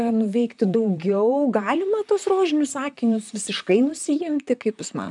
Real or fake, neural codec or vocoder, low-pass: fake; vocoder, 44.1 kHz, 128 mel bands, Pupu-Vocoder; 10.8 kHz